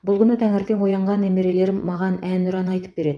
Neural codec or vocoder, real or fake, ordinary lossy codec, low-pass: vocoder, 22.05 kHz, 80 mel bands, WaveNeXt; fake; none; none